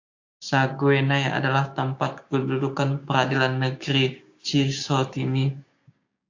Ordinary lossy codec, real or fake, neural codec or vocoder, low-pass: AAC, 48 kbps; fake; codec, 16 kHz, 6 kbps, DAC; 7.2 kHz